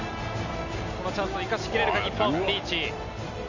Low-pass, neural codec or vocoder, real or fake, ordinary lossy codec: 7.2 kHz; none; real; none